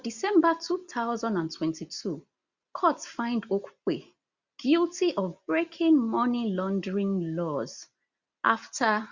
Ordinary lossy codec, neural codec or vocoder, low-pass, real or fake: Opus, 64 kbps; none; 7.2 kHz; real